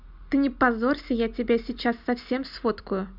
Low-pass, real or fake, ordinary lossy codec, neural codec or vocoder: 5.4 kHz; real; none; none